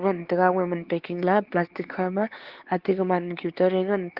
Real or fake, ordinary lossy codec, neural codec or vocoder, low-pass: fake; Opus, 16 kbps; codec, 16 kHz, 4 kbps, X-Codec, HuBERT features, trained on LibriSpeech; 5.4 kHz